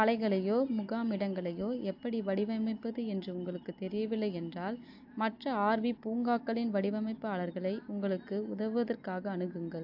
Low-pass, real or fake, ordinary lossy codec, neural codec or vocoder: 5.4 kHz; real; AAC, 48 kbps; none